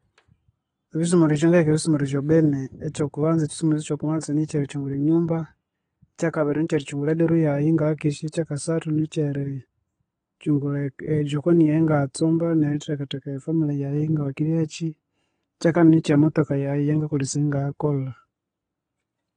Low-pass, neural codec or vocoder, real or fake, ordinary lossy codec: 9.9 kHz; none; real; AAC, 32 kbps